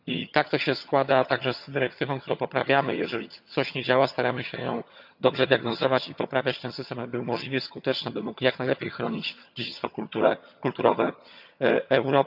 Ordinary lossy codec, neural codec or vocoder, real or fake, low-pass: none; vocoder, 22.05 kHz, 80 mel bands, HiFi-GAN; fake; 5.4 kHz